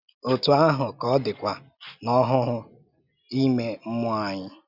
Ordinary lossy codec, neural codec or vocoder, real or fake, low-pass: Opus, 64 kbps; none; real; 5.4 kHz